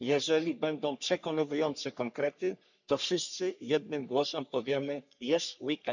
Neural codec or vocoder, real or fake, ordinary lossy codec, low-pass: codec, 44.1 kHz, 3.4 kbps, Pupu-Codec; fake; none; 7.2 kHz